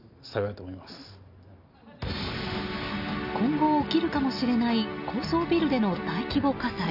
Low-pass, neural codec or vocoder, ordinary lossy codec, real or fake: 5.4 kHz; none; none; real